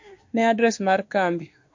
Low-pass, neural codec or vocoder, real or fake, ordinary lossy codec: 7.2 kHz; autoencoder, 48 kHz, 32 numbers a frame, DAC-VAE, trained on Japanese speech; fake; MP3, 48 kbps